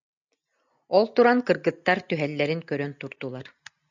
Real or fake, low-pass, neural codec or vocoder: real; 7.2 kHz; none